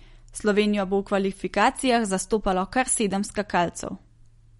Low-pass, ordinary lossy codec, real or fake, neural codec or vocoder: 19.8 kHz; MP3, 48 kbps; real; none